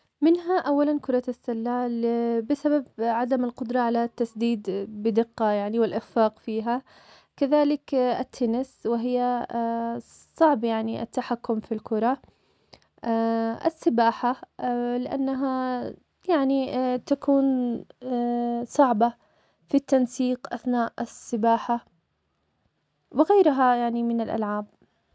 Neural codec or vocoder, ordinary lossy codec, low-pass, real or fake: none; none; none; real